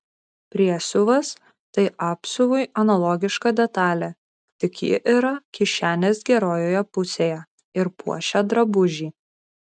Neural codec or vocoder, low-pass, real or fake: none; 9.9 kHz; real